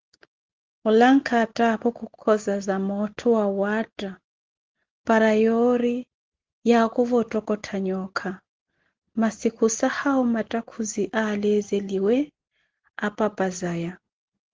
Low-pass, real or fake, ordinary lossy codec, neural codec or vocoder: 7.2 kHz; real; Opus, 16 kbps; none